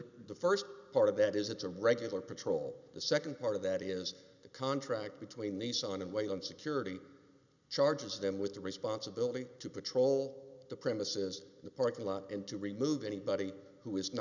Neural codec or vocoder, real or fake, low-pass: none; real; 7.2 kHz